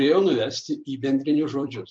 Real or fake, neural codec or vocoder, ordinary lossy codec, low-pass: fake; vocoder, 44.1 kHz, 128 mel bands every 256 samples, BigVGAN v2; MP3, 64 kbps; 9.9 kHz